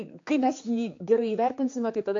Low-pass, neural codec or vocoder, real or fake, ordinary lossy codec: 7.2 kHz; codec, 16 kHz, 4 kbps, X-Codec, HuBERT features, trained on general audio; fake; AAC, 48 kbps